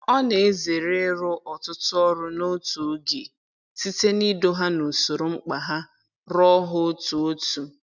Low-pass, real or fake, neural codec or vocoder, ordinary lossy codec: 7.2 kHz; real; none; none